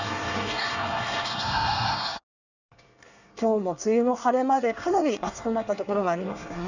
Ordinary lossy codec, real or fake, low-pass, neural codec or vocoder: none; fake; 7.2 kHz; codec, 24 kHz, 1 kbps, SNAC